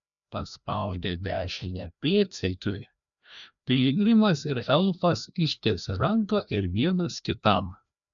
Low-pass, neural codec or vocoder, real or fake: 7.2 kHz; codec, 16 kHz, 1 kbps, FreqCodec, larger model; fake